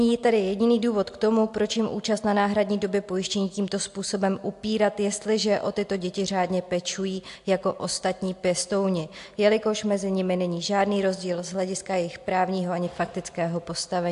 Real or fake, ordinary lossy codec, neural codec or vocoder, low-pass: real; AAC, 64 kbps; none; 10.8 kHz